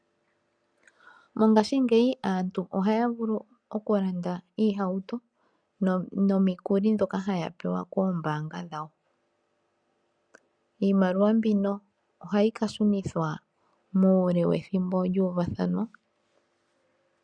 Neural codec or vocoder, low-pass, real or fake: none; 9.9 kHz; real